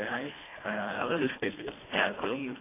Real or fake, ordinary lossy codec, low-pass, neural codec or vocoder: fake; AAC, 16 kbps; 3.6 kHz; codec, 24 kHz, 1.5 kbps, HILCodec